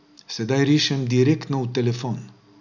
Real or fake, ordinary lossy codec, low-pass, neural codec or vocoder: real; none; 7.2 kHz; none